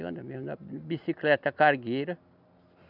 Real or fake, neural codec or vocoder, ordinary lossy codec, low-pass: real; none; none; 5.4 kHz